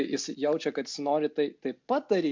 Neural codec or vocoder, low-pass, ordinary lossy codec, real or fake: none; 7.2 kHz; MP3, 64 kbps; real